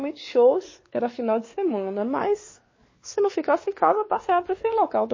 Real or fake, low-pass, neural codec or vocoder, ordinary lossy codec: fake; 7.2 kHz; codec, 16 kHz, 2 kbps, X-Codec, WavLM features, trained on Multilingual LibriSpeech; MP3, 32 kbps